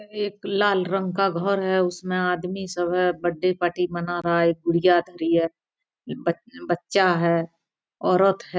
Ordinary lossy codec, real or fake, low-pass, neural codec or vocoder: none; real; none; none